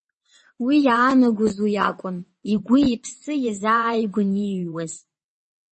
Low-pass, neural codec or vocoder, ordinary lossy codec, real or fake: 9.9 kHz; vocoder, 22.05 kHz, 80 mel bands, WaveNeXt; MP3, 32 kbps; fake